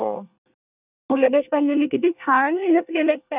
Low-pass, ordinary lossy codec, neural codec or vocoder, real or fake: 3.6 kHz; none; codec, 24 kHz, 1 kbps, SNAC; fake